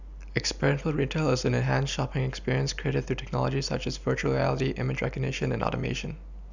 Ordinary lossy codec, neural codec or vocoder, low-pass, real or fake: none; none; 7.2 kHz; real